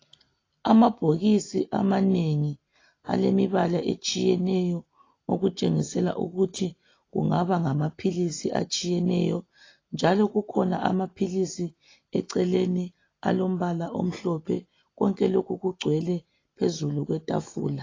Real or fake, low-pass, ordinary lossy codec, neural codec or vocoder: real; 7.2 kHz; AAC, 32 kbps; none